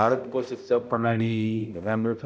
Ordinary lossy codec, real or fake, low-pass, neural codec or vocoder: none; fake; none; codec, 16 kHz, 0.5 kbps, X-Codec, HuBERT features, trained on balanced general audio